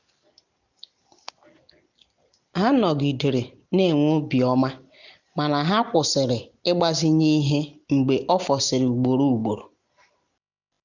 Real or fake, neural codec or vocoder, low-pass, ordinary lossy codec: real; none; 7.2 kHz; none